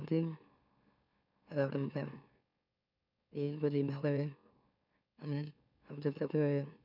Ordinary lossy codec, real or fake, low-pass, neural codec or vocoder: none; fake; 5.4 kHz; autoencoder, 44.1 kHz, a latent of 192 numbers a frame, MeloTTS